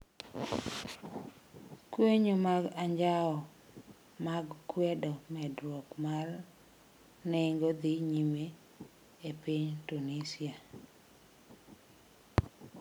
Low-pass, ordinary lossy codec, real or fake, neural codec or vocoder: none; none; real; none